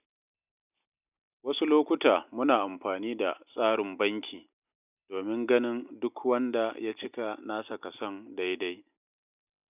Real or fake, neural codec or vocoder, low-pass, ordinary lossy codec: real; none; 3.6 kHz; none